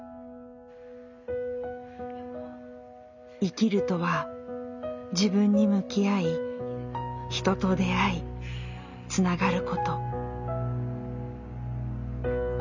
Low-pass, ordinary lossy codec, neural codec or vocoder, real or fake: 7.2 kHz; none; none; real